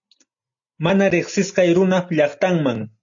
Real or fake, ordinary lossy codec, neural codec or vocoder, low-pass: real; AAC, 64 kbps; none; 7.2 kHz